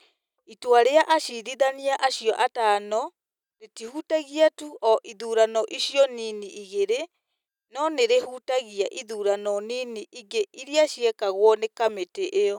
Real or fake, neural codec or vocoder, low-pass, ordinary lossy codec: real; none; 19.8 kHz; none